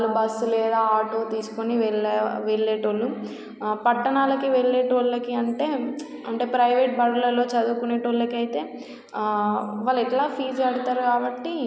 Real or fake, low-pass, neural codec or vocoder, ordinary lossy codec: real; none; none; none